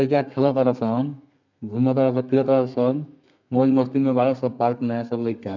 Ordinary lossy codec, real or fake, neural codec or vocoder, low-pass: none; fake; codec, 32 kHz, 1.9 kbps, SNAC; 7.2 kHz